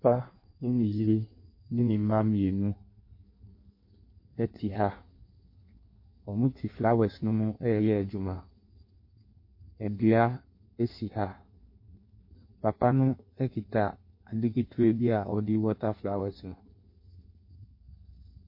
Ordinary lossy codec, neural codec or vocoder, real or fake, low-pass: MP3, 32 kbps; codec, 16 kHz in and 24 kHz out, 1.1 kbps, FireRedTTS-2 codec; fake; 5.4 kHz